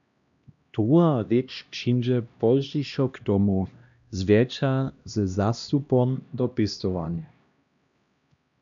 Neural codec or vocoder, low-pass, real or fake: codec, 16 kHz, 1 kbps, X-Codec, HuBERT features, trained on LibriSpeech; 7.2 kHz; fake